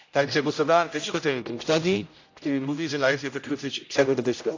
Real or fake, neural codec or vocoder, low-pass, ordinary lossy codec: fake; codec, 16 kHz, 0.5 kbps, X-Codec, HuBERT features, trained on general audio; 7.2 kHz; AAC, 48 kbps